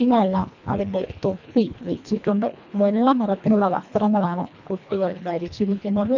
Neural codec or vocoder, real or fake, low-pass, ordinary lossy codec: codec, 24 kHz, 1.5 kbps, HILCodec; fake; 7.2 kHz; none